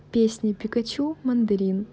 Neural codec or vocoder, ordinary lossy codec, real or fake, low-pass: none; none; real; none